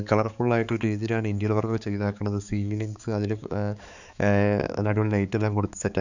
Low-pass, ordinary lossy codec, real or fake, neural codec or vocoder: 7.2 kHz; none; fake; codec, 16 kHz, 4 kbps, X-Codec, HuBERT features, trained on balanced general audio